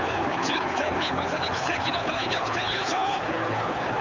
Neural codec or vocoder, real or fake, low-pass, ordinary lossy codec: codec, 24 kHz, 6 kbps, HILCodec; fake; 7.2 kHz; MP3, 64 kbps